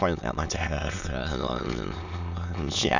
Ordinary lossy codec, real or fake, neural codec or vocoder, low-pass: none; fake; autoencoder, 22.05 kHz, a latent of 192 numbers a frame, VITS, trained on many speakers; 7.2 kHz